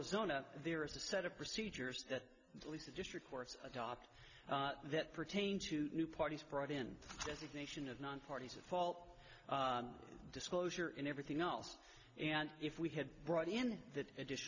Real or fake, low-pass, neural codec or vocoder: real; 7.2 kHz; none